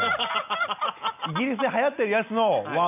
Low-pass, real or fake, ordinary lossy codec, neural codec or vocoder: 3.6 kHz; real; none; none